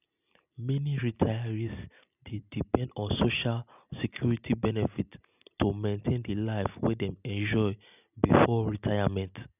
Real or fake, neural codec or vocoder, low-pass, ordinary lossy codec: real; none; 3.6 kHz; none